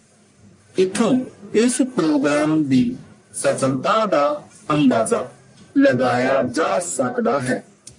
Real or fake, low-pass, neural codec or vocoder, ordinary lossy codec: fake; 10.8 kHz; codec, 44.1 kHz, 1.7 kbps, Pupu-Codec; MP3, 48 kbps